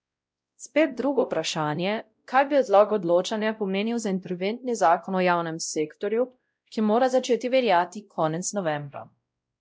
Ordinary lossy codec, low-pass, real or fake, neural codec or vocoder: none; none; fake; codec, 16 kHz, 0.5 kbps, X-Codec, WavLM features, trained on Multilingual LibriSpeech